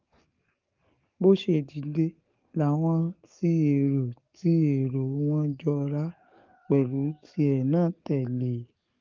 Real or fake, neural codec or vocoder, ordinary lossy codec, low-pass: fake; codec, 44.1 kHz, 7.8 kbps, DAC; Opus, 32 kbps; 7.2 kHz